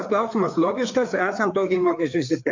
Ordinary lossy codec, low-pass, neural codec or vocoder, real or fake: MP3, 64 kbps; 7.2 kHz; codec, 16 kHz, 4 kbps, FreqCodec, larger model; fake